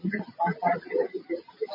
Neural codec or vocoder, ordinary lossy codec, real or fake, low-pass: none; MP3, 24 kbps; real; 5.4 kHz